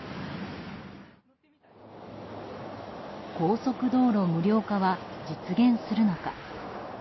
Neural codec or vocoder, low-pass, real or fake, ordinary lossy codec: none; 7.2 kHz; real; MP3, 24 kbps